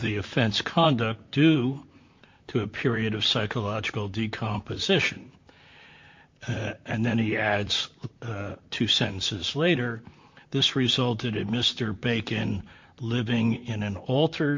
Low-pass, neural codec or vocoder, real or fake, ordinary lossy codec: 7.2 kHz; vocoder, 44.1 kHz, 128 mel bands, Pupu-Vocoder; fake; MP3, 48 kbps